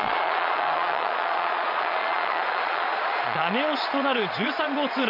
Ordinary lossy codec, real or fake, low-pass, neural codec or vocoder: none; fake; 5.4 kHz; vocoder, 22.05 kHz, 80 mel bands, Vocos